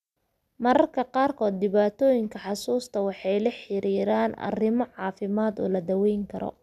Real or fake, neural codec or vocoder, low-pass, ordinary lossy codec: real; none; 14.4 kHz; none